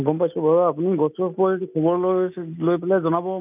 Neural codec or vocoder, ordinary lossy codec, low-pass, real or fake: none; none; 3.6 kHz; real